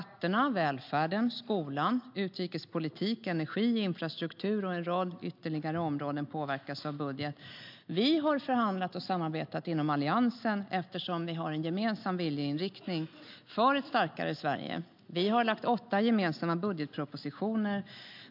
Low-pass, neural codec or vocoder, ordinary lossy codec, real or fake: 5.4 kHz; none; MP3, 48 kbps; real